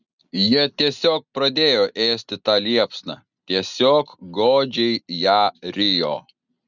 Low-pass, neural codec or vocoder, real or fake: 7.2 kHz; none; real